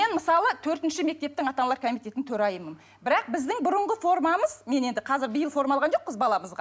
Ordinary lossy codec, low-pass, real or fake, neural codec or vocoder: none; none; real; none